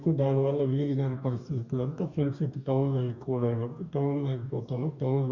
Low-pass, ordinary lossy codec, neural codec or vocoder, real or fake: 7.2 kHz; none; codec, 44.1 kHz, 2.6 kbps, DAC; fake